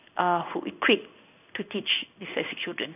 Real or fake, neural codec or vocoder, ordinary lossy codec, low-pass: fake; codec, 16 kHz in and 24 kHz out, 1 kbps, XY-Tokenizer; none; 3.6 kHz